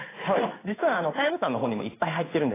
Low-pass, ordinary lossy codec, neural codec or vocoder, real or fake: 3.6 kHz; AAC, 16 kbps; codec, 16 kHz in and 24 kHz out, 1 kbps, XY-Tokenizer; fake